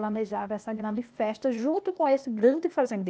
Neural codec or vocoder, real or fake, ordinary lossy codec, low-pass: codec, 16 kHz, 0.8 kbps, ZipCodec; fake; none; none